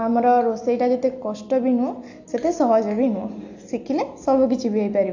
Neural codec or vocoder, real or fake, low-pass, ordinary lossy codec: none; real; 7.2 kHz; AAC, 48 kbps